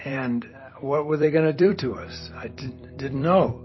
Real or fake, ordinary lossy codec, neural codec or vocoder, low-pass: fake; MP3, 24 kbps; vocoder, 44.1 kHz, 128 mel bands every 256 samples, BigVGAN v2; 7.2 kHz